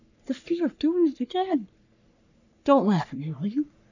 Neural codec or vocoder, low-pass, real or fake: codec, 44.1 kHz, 3.4 kbps, Pupu-Codec; 7.2 kHz; fake